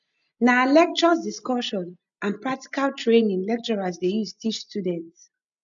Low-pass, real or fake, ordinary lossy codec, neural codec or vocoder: 7.2 kHz; real; none; none